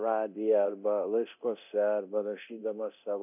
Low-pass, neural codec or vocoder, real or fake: 3.6 kHz; codec, 24 kHz, 0.9 kbps, DualCodec; fake